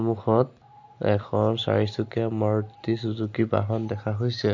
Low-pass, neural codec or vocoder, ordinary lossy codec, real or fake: 7.2 kHz; none; AAC, 48 kbps; real